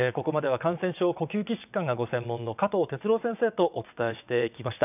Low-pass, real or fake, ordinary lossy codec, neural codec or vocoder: 3.6 kHz; fake; none; vocoder, 22.05 kHz, 80 mel bands, WaveNeXt